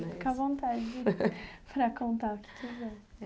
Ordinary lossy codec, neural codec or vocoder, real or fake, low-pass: none; none; real; none